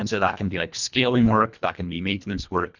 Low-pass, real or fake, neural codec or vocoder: 7.2 kHz; fake; codec, 24 kHz, 1.5 kbps, HILCodec